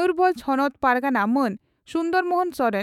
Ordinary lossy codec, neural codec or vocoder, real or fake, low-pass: none; autoencoder, 48 kHz, 128 numbers a frame, DAC-VAE, trained on Japanese speech; fake; 19.8 kHz